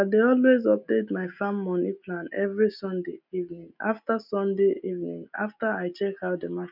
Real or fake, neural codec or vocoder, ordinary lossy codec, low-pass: real; none; none; 5.4 kHz